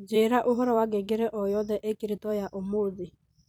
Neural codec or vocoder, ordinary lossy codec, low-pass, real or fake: vocoder, 44.1 kHz, 128 mel bands every 256 samples, BigVGAN v2; none; none; fake